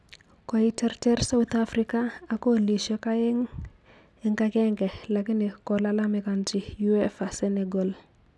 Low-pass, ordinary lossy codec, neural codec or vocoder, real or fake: none; none; none; real